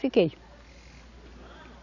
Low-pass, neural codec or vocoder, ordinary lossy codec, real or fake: 7.2 kHz; none; none; real